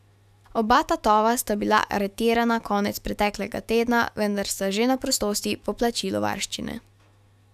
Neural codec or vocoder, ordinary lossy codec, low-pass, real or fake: autoencoder, 48 kHz, 128 numbers a frame, DAC-VAE, trained on Japanese speech; none; 14.4 kHz; fake